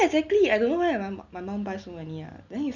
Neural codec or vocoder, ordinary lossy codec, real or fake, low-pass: none; none; real; 7.2 kHz